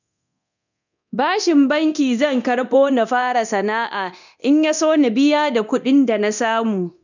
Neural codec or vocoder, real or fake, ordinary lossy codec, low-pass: codec, 24 kHz, 0.9 kbps, DualCodec; fake; none; 7.2 kHz